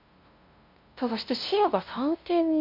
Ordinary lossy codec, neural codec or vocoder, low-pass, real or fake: MP3, 32 kbps; codec, 16 kHz, 0.5 kbps, FunCodec, trained on LibriTTS, 25 frames a second; 5.4 kHz; fake